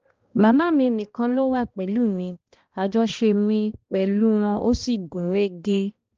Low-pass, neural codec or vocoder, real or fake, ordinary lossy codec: 7.2 kHz; codec, 16 kHz, 1 kbps, X-Codec, HuBERT features, trained on balanced general audio; fake; Opus, 24 kbps